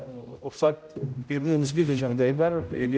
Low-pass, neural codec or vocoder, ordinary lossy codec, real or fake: none; codec, 16 kHz, 0.5 kbps, X-Codec, HuBERT features, trained on general audio; none; fake